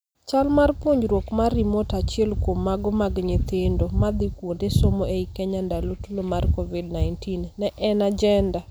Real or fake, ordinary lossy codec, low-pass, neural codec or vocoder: real; none; none; none